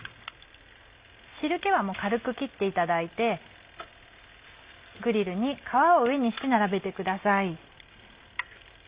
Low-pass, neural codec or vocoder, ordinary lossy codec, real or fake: 3.6 kHz; none; Opus, 64 kbps; real